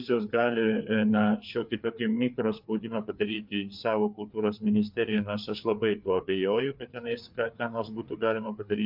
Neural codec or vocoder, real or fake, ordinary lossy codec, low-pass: codec, 16 kHz, 4 kbps, FreqCodec, larger model; fake; MP3, 48 kbps; 5.4 kHz